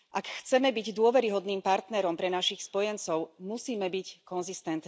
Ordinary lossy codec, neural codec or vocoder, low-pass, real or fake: none; none; none; real